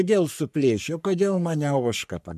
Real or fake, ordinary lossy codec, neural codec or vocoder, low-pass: fake; MP3, 96 kbps; codec, 44.1 kHz, 3.4 kbps, Pupu-Codec; 14.4 kHz